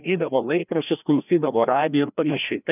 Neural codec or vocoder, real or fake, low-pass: codec, 16 kHz, 1 kbps, FreqCodec, larger model; fake; 3.6 kHz